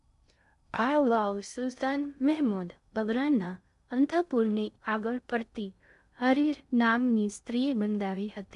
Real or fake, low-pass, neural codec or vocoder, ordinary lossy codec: fake; 10.8 kHz; codec, 16 kHz in and 24 kHz out, 0.6 kbps, FocalCodec, streaming, 2048 codes; none